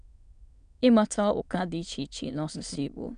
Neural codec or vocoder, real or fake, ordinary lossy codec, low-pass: autoencoder, 22.05 kHz, a latent of 192 numbers a frame, VITS, trained on many speakers; fake; MP3, 96 kbps; 9.9 kHz